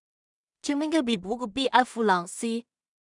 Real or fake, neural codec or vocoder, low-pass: fake; codec, 16 kHz in and 24 kHz out, 0.4 kbps, LongCat-Audio-Codec, two codebook decoder; 10.8 kHz